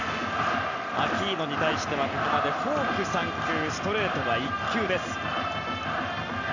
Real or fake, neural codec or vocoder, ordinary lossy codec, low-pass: real; none; none; 7.2 kHz